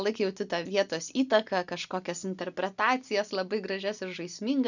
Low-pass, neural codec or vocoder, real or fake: 7.2 kHz; none; real